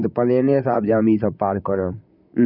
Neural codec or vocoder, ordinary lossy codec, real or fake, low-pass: vocoder, 44.1 kHz, 128 mel bands, Pupu-Vocoder; none; fake; 5.4 kHz